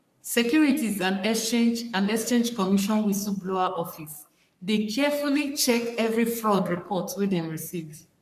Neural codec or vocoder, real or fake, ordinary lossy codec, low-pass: codec, 44.1 kHz, 3.4 kbps, Pupu-Codec; fake; MP3, 96 kbps; 14.4 kHz